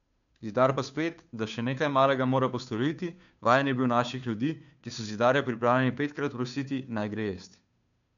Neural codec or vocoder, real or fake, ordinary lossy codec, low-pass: codec, 16 kHz, 2 kbps, FunCodec, trained on Chinese and English, 25 frames a second; fake; none; 7.2 kHz